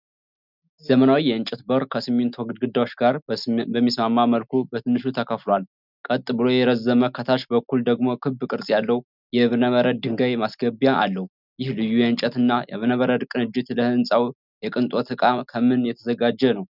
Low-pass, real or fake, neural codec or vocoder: 5.4 kHz; real; none